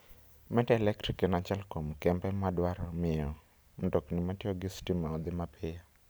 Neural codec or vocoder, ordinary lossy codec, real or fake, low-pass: none; none; real; none